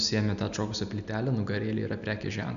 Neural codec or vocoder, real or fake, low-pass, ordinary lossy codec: none; real; 7.2 kHz; AAC, 96 kbps